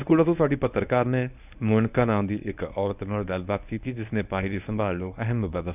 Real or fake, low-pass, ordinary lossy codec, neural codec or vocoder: fake; 3.6 kHz; none; codec, 24 kHz, 0.9 kbps, WavTokenizer, medium speech release version 1